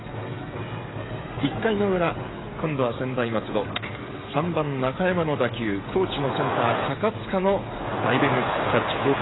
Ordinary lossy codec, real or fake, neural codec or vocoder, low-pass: AAC, 16 kbps; fake; codec, 16 kHz, 16 kbps, FreqCodec, smaller model; 7.2 kHz